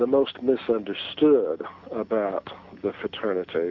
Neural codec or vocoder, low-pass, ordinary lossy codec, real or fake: none; 7.2 kHz; AAC, 48 kbps; real